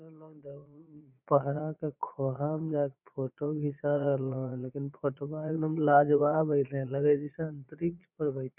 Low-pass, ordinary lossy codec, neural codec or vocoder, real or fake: 3.6 kHz; none; vocoder, 22.05 kHz, 80 mel bands, Vocos; fake